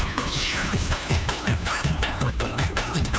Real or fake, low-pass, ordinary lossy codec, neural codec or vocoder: fake; none; none; codec, 16 kHz, 1 kbps, FunCodec, trained on LibriTTS, 50 frames a second